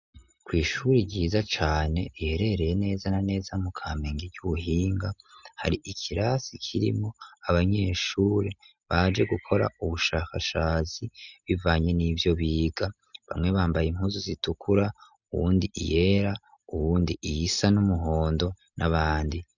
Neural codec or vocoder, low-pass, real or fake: none; 7.2 kHz; real